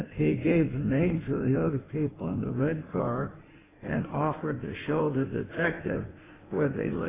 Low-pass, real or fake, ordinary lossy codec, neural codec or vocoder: 3.6 kHz; fake; AAC, 16 kbps; codec, 16 kHz in and 24 kHz out, 1.1 kbps, FireRedTTS-2 codec